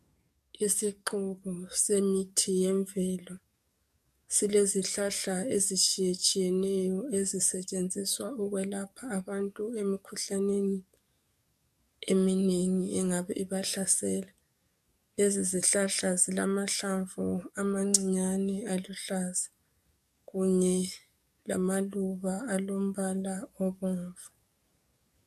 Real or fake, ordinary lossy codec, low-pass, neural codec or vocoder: fake; MP3, 96 kbps; 14.4 kHz; codec, 44.1 kHz, 7.8 kbps, DAC